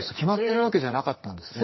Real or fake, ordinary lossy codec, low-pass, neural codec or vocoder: fake; MP3, 24 kbps; 7.2 kHz; codec, 44.1 kHz, 2.6 kbps, SNAC